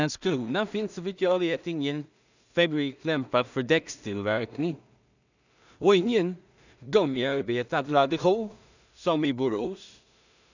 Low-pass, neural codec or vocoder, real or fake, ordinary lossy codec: 7.2 kHz; codec, 16 kHz in and 24 kHz out, 0.4 kbps, LongCat-Audio-Codec, two codebook decoder; fake; none